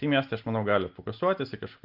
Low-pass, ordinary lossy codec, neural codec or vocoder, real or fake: 5.4 kHz; Opus, 32 kbps; none; real